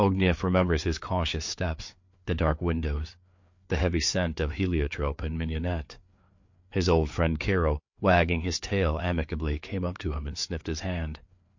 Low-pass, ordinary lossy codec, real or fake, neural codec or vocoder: 7.2 kHz; MP3, 48 kbps; fake; codec, 16 kHz, 4 kbps, FreqCodec, larger model